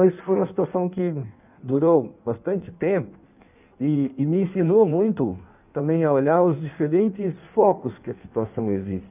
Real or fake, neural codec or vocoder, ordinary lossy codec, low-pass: fake; codec, 16 kHz in and 24 kHz out, 1.1 kbps, FireRedTTS-2 codec; none; 3.6 kHz